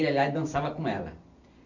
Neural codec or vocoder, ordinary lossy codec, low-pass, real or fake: none; none; 7.2 kHz; real